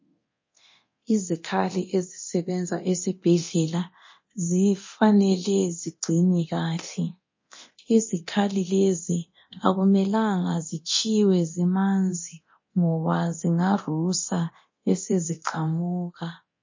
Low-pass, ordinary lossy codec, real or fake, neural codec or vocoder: 7.2 kHz; MP3, 32 kbps; fake; codec, 24 kHz, 0.9 kbps, DualCodec